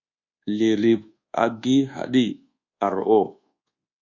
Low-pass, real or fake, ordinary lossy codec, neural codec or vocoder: 7.2 kHz; fake; Opus, 64 kbps; codec, 24 kHz, 1.2 kbps, DualCodec